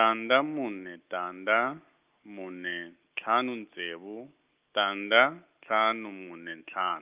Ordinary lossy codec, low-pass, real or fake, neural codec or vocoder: Opus, 24 kbps; 3.6 kHz; real; none